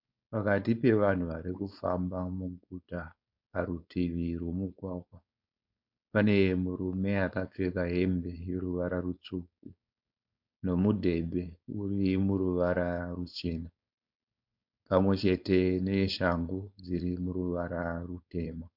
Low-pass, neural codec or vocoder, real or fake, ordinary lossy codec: 5.4 kHz; codec, 16 kHz, 4.8 kbps, FACodec; fake; MP3, 48 kbps